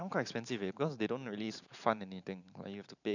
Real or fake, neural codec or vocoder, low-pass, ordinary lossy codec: fake; vocoder, 44.1 kHz, 128 mel bands every 512 samples, BigVGAN v2; 7.2 kHz; none